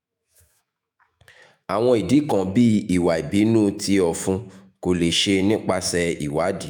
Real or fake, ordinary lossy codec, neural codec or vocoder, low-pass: fake; none; autoencoder, 48 kHz, 128 numbers a frame, DAC-VAE, trained on Japanese speech; none